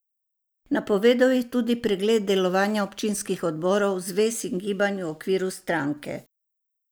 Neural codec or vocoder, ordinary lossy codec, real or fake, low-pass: none; none; real; none